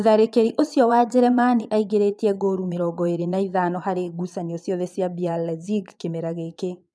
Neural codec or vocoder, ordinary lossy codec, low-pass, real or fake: vocoder, 22.05 kHz, 80 mel bands, Vocos; none; none; fake